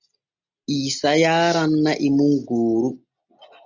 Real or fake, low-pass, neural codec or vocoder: real; 7.2 kHz; none